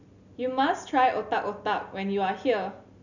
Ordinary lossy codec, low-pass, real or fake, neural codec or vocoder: none; 7.2 kHz; real; none